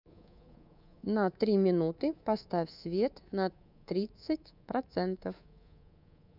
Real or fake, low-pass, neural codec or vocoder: fake; 5.4 kHz; codec, 24 kHz, 3.1 kbps, DualCodec